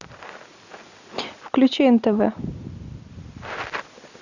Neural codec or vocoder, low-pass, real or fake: none; 7.2 kHz; real